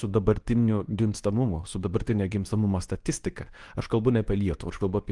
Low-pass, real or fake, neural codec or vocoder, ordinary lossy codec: 10.8 kHz; fake; codec, 24 kHz, 0.9 kbps, WavTokenizer, medium speech release version 2; Opus, 24 kbps